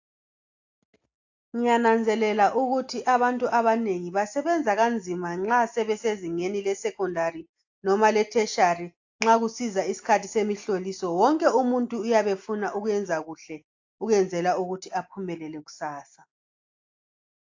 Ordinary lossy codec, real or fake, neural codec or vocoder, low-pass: AAC, 48 kbps; real; none; 7.2 kHz